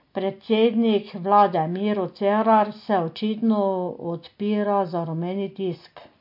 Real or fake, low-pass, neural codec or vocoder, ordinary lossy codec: real; 5.4 kHz; none; MP3, 32 kbps